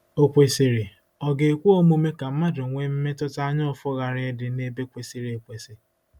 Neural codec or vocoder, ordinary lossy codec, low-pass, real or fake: none; none; 19.8 kHz; real